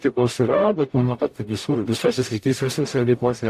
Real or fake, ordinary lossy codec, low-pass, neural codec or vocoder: fake; AAC, 64 kbps; 14.4 kHz; codec, 44.1 kHz, 0.9 kbps, DAC